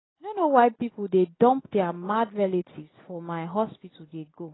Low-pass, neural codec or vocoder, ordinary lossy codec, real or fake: 7.2 kHz; none; AAC, 16 kbps; real